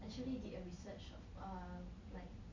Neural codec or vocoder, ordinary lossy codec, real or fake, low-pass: none; MP3, 48 kbps; real; 7.2 kHz